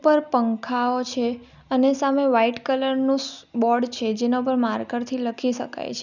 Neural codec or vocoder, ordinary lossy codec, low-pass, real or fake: none; none; 7.2 kHz; real